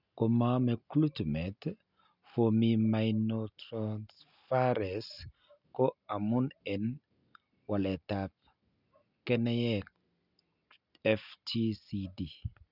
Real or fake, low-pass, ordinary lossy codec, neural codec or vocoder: real; 5.4 kHz; none; none